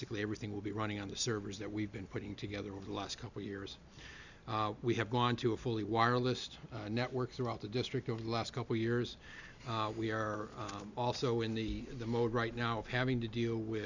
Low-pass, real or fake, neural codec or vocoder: 7.2 kHz; real; none